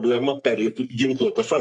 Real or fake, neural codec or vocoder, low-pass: fake; codec, 44.1 kHz, 3.4 kbps, Pupu-Codec; 10.8 kHz